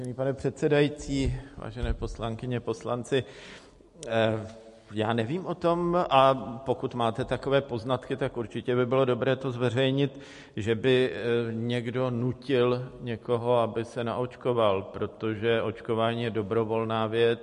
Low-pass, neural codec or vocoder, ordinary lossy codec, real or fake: 14.4 kHz; none; MP3, 48 kbps; real